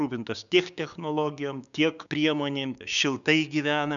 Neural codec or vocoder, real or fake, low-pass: codec, 16 kHz, 6 kbps, DAC; fake; 7.2 kHz